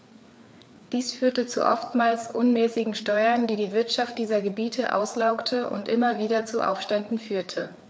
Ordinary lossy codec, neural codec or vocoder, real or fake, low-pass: none; codec, 16 kHz, 4 kbps, FreqCodec, larger model; fake; none